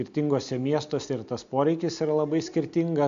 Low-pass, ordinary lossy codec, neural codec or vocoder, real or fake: 7.2 kHz; MP3, 64 kbps; none; real